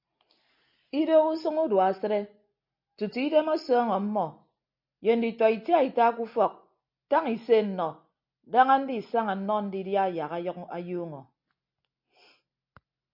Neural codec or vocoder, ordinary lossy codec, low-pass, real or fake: none; MP3, 48 kbps; 5.4 kHz; real